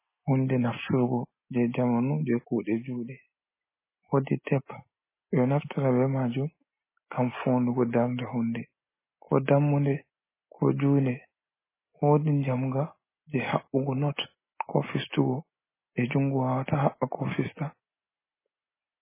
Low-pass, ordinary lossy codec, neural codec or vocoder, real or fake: 3.6 kHz; MP3, 16 kbps; none; real